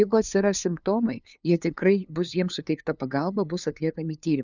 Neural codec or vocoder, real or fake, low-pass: codec, 16 kHz, 2 kbps, FunCodec, trained on LibriTTS, 25 frames a second; fake; 7.2 kHz